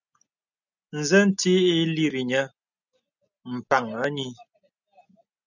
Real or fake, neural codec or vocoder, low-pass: real; none; 7.2 kHz